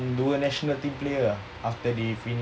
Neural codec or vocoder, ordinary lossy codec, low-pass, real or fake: none; none; none; real